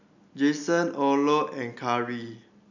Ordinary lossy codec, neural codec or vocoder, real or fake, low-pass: none; none; real; 7.2 kHz